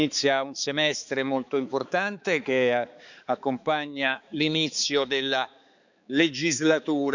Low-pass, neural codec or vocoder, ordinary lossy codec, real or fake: 7.2 kHz; codec, 16 kHz, 4 kbps, X-Codec, HuBERT features, trained on balanced general audio; none; fake